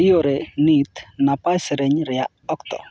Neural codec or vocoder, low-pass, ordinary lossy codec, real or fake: none; none; none; real